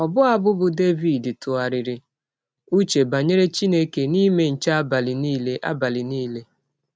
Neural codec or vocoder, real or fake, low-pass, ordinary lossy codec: none; real; none; none